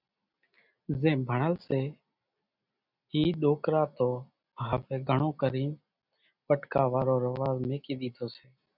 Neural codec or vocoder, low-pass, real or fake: none; 5.4 kHz; real